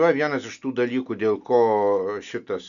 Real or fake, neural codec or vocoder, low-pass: real; none; 7.2 kHz